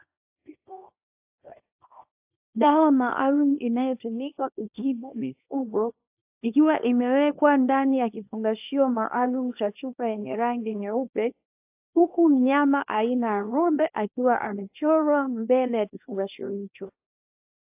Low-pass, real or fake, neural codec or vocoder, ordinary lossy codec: 3.6 kHz; fake; codec, 24 kHz, 0.9 kbps, WavTokenizer, small release; AAC, 32 kbps